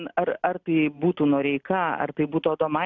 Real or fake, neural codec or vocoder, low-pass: real; none; 7.2 kHz